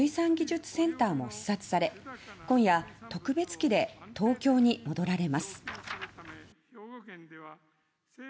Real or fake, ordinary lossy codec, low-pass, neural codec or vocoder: real; none; none; none